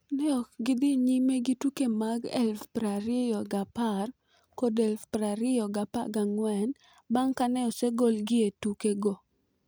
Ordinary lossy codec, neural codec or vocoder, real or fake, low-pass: none; none; real; none